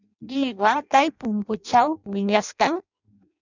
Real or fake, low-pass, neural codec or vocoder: fake; 7.2 kHz; codec, 16 kHz in and 24 kHz out, 0.6 kbps, FireRedTTS-2 codec